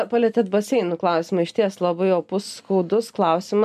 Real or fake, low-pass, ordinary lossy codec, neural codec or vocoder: real; 14.4 kHz; MP3, 96 kbps; none